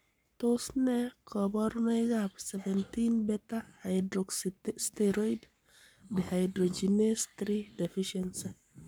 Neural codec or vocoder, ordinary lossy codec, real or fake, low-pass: codec, 44.1 kHz, 7.8 kbps, Pupu-Codec; none; fake; none